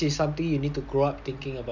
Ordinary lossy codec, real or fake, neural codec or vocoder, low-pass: none; real; none; 7.2 kHz